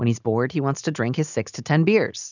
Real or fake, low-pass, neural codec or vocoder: real; 7.2 kHz; none